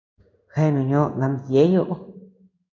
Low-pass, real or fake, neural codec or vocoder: 7.2 kHz; fake; codec, 16 kHz in and 24 kHz out, 1 kbps, XY-Tokenizer